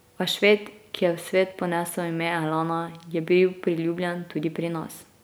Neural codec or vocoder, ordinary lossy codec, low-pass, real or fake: none; none; none; real